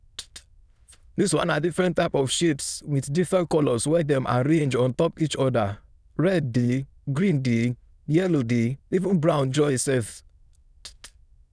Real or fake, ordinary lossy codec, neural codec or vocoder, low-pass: fake; none; autoencoder, 22.05 kHz, a latent of 192 numbers a frame, VITS, trained on many speakers; none